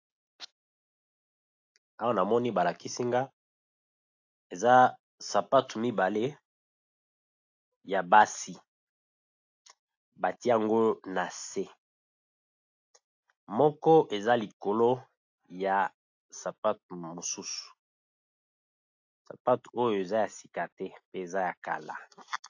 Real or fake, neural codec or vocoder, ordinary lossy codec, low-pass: real; none; AAC, 48 kbps; 7.2 kHz